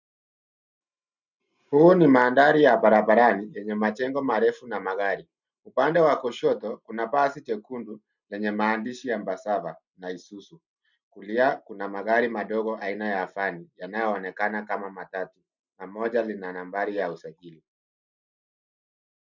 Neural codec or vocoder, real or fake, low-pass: none; real; 7.2 kHz